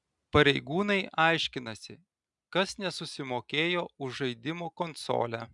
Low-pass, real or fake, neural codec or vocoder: 10.8 kHz; real; none